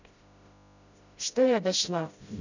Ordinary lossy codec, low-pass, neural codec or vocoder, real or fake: none; 7.2 kHz; codec, 16 kHz, 0.5 kbps, FreqCodec, smaller model; fake